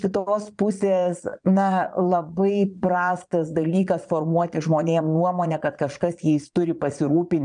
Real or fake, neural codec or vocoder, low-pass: fake; vocoder, 22.05 kHz, 80 mel bands, Vocos; 9.9 kHz